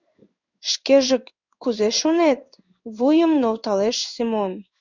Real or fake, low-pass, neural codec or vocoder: fake; 7.2 kHz; codec, 16 kHz in and 24 kHz out, 1 kbps, XY-Tokenizer